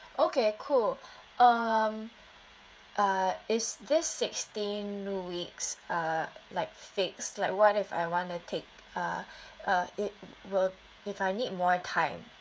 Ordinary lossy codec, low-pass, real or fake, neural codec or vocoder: none; none; fake; codec, 16 kHz, 16 kbps, FreqCodec, smaller model